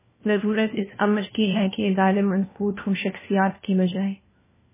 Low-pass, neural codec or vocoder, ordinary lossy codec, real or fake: 3.6 kHz; codec, 16 kHz, 1 kbps, FunCodec, trained on LibriTTS, 50 frames a second; MP3, 16 kbps; fake